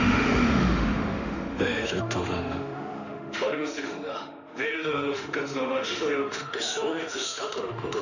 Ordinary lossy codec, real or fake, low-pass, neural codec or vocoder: none; fake; 7.2 kHz; autoencoder, 48 kHz, 32 numbers a frame, DAC-VAE, trained on Japanese speech